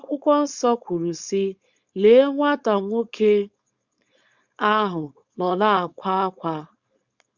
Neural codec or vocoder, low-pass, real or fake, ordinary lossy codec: codec, 16 kHz, 4.8 kbps, FACodec; 7.2 kHz; fake; Opus, 64 kbps